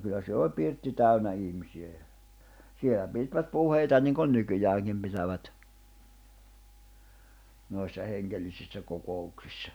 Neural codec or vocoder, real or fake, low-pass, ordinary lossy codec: none; real; none; none